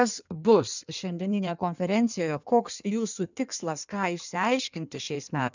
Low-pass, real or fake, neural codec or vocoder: 7.2 kHz; fake; codec, 16 kHz in and 24 kHz out, 1.1 kbps, FireRedTTS-2 codec